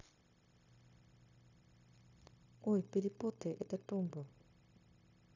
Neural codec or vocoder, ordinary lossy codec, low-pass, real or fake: codec, 16 kHz, 0.9 kbps, LongCat-Audio-Codec; none; 7.2 kHz; fake